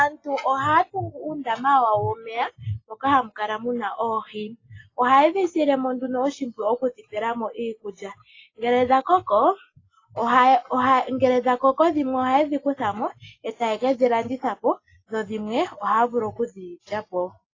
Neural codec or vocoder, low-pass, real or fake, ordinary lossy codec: none; 7.2 kHz; real; AAC, 32 kbps